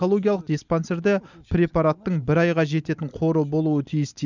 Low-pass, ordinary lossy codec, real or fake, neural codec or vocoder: 7.2 kHz; none; real; none